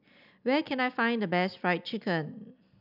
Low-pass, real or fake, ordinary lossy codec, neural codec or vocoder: 5.4 kHz; real; none; none